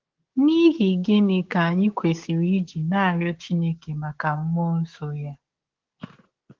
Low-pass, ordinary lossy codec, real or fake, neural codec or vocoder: 7.2 kHz; Opus, 16 kbps; fake; codec, 16 kHz, 6 kbps, DAC